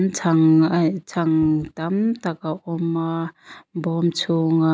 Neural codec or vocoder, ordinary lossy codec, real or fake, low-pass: none; none; real; none